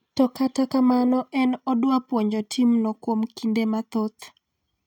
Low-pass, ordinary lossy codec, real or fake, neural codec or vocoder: 19.8 kHz; none; real; none